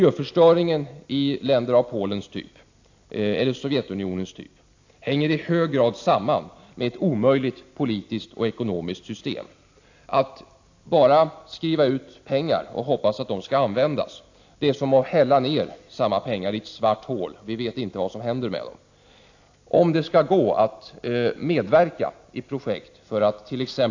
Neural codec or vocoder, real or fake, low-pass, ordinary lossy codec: none; real; 7.2 kHz; AAC, 48 kbps